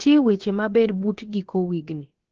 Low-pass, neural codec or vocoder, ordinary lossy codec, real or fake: 7.2 kHz; codec, 16 kHz, about 1 kbps, DyCAST, with the encoder's durations; Opus, 16 kbps; fake